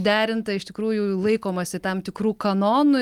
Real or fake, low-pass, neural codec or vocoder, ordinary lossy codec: real; 19.8 kHz; none; Opus, 32 kbps